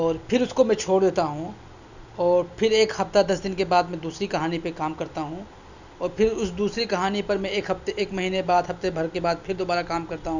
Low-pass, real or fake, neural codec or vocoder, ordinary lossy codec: 7.2 kHz; real; none; none